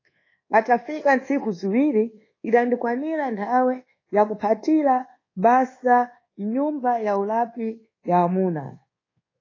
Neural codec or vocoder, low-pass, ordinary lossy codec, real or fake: codec, 24 kHz, 1.2 kbps, DualCodec; 7.2 kHz; AAC, 32 kbps; fake